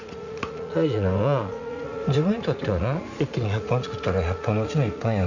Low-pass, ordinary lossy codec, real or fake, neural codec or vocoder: 7.2 kHz; none; fake; vocoder, 44.1 kHz, 80 mel bands, Vocos